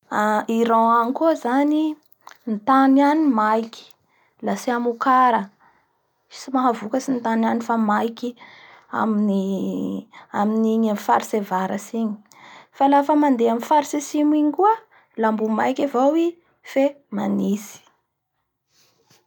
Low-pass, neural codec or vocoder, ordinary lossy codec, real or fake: 19.8 kHz; none; none; real